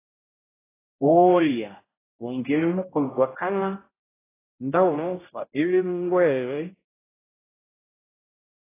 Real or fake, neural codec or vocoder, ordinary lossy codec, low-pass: fake; codec, 16 kHz, 0.5 kbps, X-Codec, HuBERT features, trained on general audio; AAC, 16 kbps; 3.6 kHz